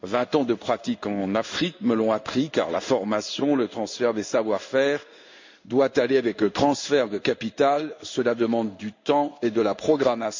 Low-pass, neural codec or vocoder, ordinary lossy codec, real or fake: 7.2 kHz; codec, 16 kHz in and 24 kHz out, 1 kbps, XY-Tokenizer; MP3, 64 kbps; fake